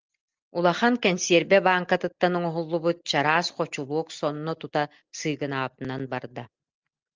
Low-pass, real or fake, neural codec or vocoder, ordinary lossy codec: 7.2 kHz; real; none; Opus, 24 kbps